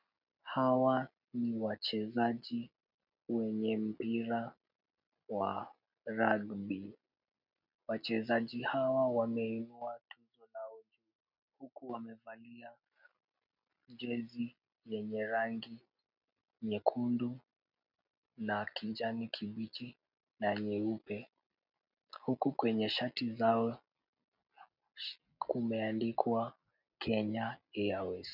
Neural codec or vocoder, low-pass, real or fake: none; 5.4 kHz; real